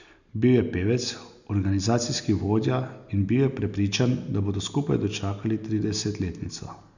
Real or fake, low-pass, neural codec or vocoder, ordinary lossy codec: real; 7.2 kHz; none; none